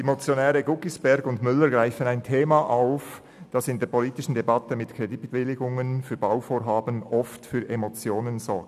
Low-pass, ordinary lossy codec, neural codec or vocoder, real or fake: 14.4 kHz; none; none; real